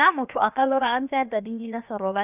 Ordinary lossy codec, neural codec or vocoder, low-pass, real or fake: none; codec, 16 kHz, about 1 kbps, DyCAST, with the encoder's durations; 3.6 kHz; fake